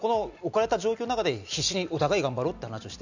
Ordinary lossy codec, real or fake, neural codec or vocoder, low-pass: none; real; none; 7.2 kHz